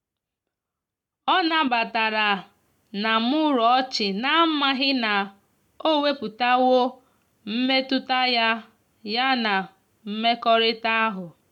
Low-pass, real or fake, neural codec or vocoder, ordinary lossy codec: 19.8 kHz; real; none; none